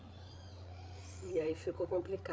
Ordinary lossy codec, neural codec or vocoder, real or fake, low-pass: none; codec, 16 kHz, 8 kbps, FreqCodec, larger model; fake; none